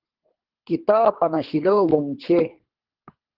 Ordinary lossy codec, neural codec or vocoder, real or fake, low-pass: Opus, 16 kbps; codec, 24 kHz, 3 kbps, HILCodec; fake; 5.4 kHz